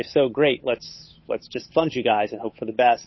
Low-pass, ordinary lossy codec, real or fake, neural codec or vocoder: 7.2 kHz; MP3, 24 kbps; fake; codec, 16 kHz, 8 kbps, FunCodec, trained on Chinese and English, 25 frames a second